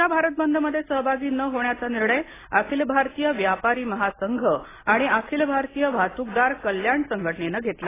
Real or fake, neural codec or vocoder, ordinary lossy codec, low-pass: real; none; AAC, 16 kbps; 3.6 kHz